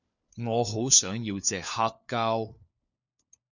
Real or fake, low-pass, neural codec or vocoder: fake; 7.2 kHz; codec, 16 kHz, 4 kbps, FunCodec, trained on LibriTTS, 50 frames a second